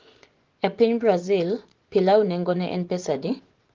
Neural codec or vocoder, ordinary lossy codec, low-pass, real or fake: vocoder, 24 kHz, 100 mel bands, Vocos; Opus, 16 kbps; 7.2 kHz; fake